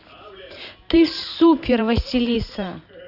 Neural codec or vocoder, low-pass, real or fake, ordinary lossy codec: vocoder, 44.1 kHz, 128 mel bands every 512 samples, BigVGAN v2; 5.4 kHz; fake; none